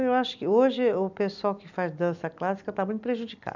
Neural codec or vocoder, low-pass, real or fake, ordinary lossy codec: none; 7.2 kHz; real; none